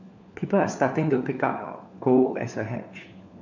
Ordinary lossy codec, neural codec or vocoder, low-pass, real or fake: none; codec, 16 kHz, 4 kbps, FunCodec, trained on LibriTTS, 50 frames a second; 7.2 kHz; fake